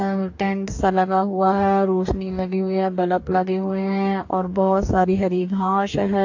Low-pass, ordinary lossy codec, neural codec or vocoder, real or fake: 7.2 kHz; AAC, 48 kbps; codec, 44.1 kHz, 2.6 kbps, DAC; fake